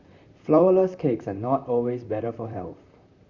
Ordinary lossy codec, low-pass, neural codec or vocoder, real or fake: Opus, 64 kbps; 7.2 kHz; vocoder, 44.1 kHz, 128 mel bands, Pupu-Vocoder; fake